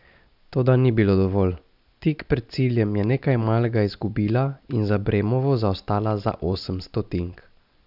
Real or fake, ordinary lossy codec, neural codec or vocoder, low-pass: real; none; none; 5.4 kHz